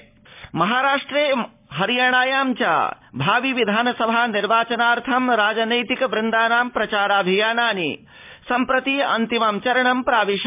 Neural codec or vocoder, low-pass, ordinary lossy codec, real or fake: none; 3.6 kHz; MP3, 32 kbps; real